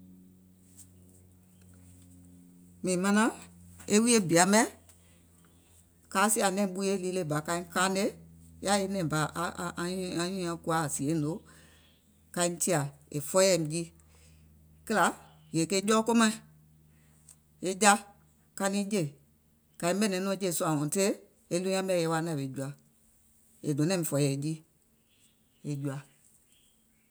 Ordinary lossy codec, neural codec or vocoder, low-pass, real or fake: none; none; none; real